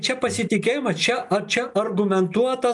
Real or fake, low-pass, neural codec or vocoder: real; 10.8 kHz; none